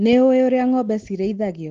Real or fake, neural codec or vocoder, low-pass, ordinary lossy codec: real; none; 7.2 kHz; Opus, 16 kbps